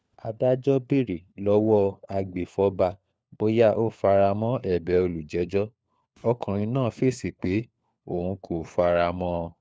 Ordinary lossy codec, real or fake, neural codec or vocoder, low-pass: none; fake; codec, 16 kHz, 4 kbps, FunCodec, trained on LibriTTS, 50 frames a second; none